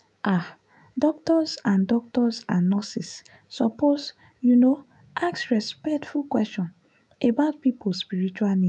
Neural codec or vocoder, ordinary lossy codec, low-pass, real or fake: autoencoder, 48 kHz, 128 numbers a frame, DAC-VAE, trained on Japanese speech; none; 10.8 kHz; fake